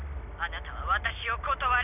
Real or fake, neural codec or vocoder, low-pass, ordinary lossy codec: real; none; 3.6 kHz; none